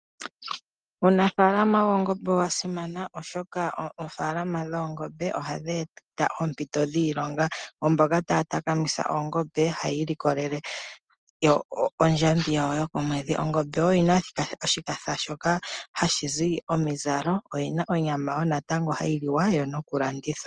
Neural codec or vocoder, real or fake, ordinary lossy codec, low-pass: none; real; Opus, 24 kbps; 9.9 kHz